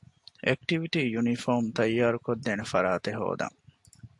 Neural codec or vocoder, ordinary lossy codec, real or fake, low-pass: none; AAC, 64 kbps; real; 10.8 kHz